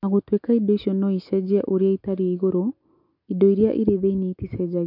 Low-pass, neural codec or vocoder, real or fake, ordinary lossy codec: 5.4 kHz; none; real; MP3, 48 kbps